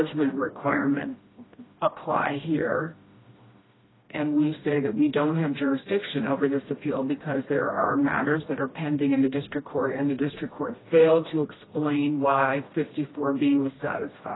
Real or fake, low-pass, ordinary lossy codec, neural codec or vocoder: fake; 7.2 kHz; AAC, 16 kbps; codec, 16 kHz, 1 kbps, FreqCodec, smaller model